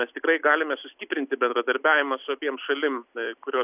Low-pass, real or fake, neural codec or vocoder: 3.6 kHz; real; none